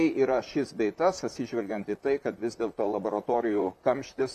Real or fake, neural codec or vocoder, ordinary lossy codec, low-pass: fake; vocoder, 44.1 kHz, 128 mel bands, Pupu-Vocoder; MP3, 96 kbps; 14.4 kHz